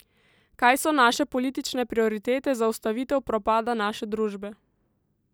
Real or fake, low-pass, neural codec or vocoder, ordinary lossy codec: real; none; none; none